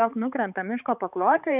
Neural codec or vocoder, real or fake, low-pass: codec, 16 kHz, 4 kbps, X-Codec, HuBERT features, trained on balanced general audio; fake; 3.6 kHz